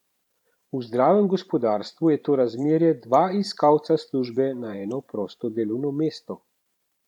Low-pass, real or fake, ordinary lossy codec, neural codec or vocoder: 19.8 kHz; fake; none; vocoder, 44.1 kHz, 128 mel bands every 512 samples, BigVGAN v2